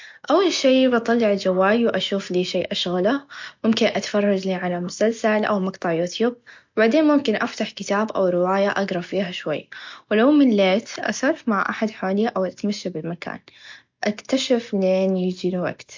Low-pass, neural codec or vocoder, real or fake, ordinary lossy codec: 7.2 kHz; none; real; MP3, 48 kbps